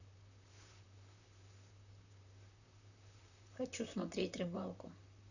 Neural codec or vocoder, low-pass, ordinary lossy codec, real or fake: vocoder, 44.1 kHz, 128 mel bands, Pupu-Vocoder; 7.2 kHz; none; fake